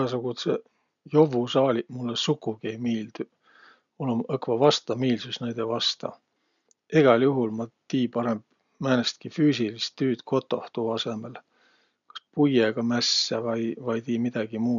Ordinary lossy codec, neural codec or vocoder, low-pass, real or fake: none; none; 7.2 kHz; real